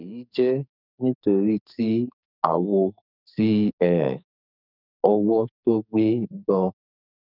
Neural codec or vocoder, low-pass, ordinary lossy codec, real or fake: codec, 44.1 kHz, 2.6 kbps, SNAC; 5.4 kHz; none; fake